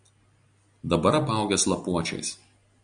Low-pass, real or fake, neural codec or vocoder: 9.9 kHz; real; none